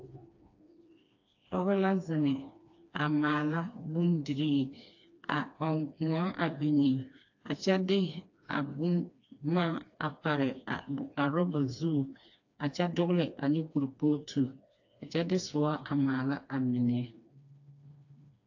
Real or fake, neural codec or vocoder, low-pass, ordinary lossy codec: fake; codec, 16 kHz, 2 kbps, FreqCodec, smaller model; 7.2 kHz; AAC, 48 kbps